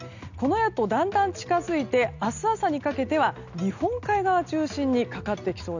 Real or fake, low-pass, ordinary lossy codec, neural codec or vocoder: real; 7.2 kHz; none; none